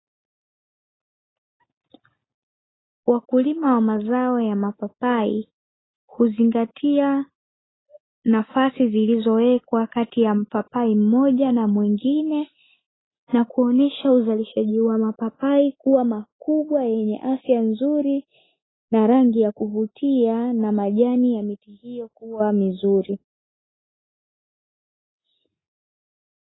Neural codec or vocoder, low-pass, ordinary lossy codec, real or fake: none; 7.2 kHz; AAC, 16 kbps; real